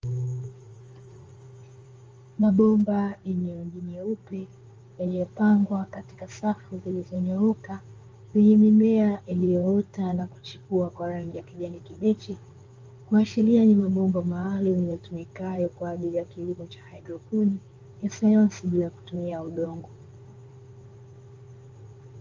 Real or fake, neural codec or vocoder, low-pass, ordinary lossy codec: fake; codec, 16 kHz in and 24 kHz out, 2.2 kbps, FireRedTTS-2 codec; 7.2 kHz; Opus, 32 kbps